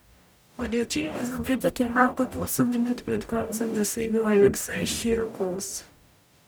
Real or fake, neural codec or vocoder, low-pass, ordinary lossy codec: fake; codec, 44.1 kHz, 0.9 kbps, DAC; none; none